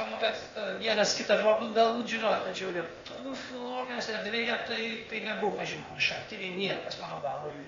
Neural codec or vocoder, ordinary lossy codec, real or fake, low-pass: codec, 16 kHz, 0.8 kbps, ZipCodec; AAC, 32 kbps; fake; 7.2 kHz